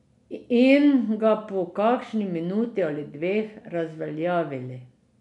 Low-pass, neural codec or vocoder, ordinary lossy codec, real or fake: 10.8 kHz; none; none; real